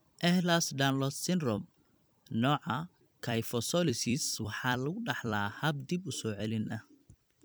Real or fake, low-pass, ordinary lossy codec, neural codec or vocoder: fake; none; none; vocoder, 44.1 kHz, 128 mel bands every 256 samples, BigVGAN v2